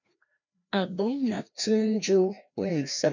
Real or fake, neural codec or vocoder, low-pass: fake; codec, 16 kHz, 1 kbps, FreqCodec, larger model; 7.2 kHz